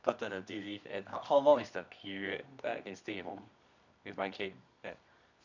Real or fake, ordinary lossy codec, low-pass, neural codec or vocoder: fake; none; 7.2 kHz; codec, 24 kHz, 0.9 kbps, WavTokenizer, medium music audio release